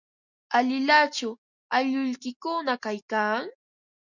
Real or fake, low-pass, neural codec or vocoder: real; 7.2 kHz; none